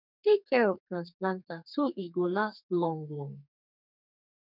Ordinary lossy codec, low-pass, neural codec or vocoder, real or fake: none; 5.4 kHz; codec, 32 kHz, 1.9 kbps, SNAC; fake